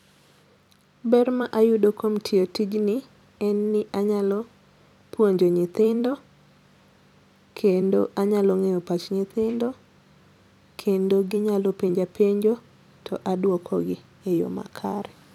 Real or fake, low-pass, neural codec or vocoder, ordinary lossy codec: fake; 19.8 kHz; vocoder, 44.1 kHz, 128 mel bands every 256 samples, BigVGAN v2; none